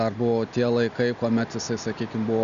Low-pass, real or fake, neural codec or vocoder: 7.2 kHz; real; none